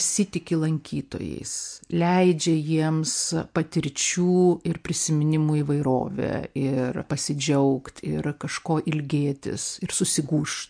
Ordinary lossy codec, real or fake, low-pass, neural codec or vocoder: MP3, 64 kbps; real; 9.9 kHz; none